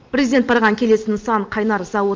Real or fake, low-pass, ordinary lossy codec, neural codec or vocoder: real; 7.2 kHz; Opus, 32 kbps; none